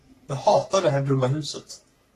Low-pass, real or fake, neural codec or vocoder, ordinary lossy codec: 14.4 kHz; fake; codec, 44.1 kHz, 3.4 kbps, Pupu-Codec; AAC, 48 kbps